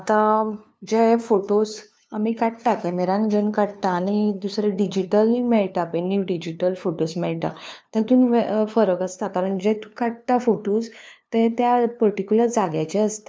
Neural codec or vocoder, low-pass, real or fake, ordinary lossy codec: codec, 16 kHz, 2 kbps, FunCodec, trained on LibriTTS, 25 frames a second; none; fake; none